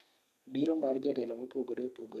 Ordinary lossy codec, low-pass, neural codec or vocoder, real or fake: none; 14.4 kHz; codec, 32 kHz, 1.9 kbps, SNAC; fake